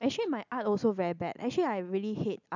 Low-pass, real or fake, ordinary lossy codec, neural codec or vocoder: 7.2 kHz; real; none; none